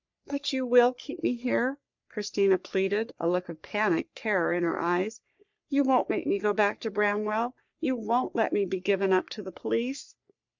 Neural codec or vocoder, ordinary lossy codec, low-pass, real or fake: codec, 44.1 kHz, 3.4 kbps, Pupu-Codec; MP3, 64 kbps; 7.2 kHz; fake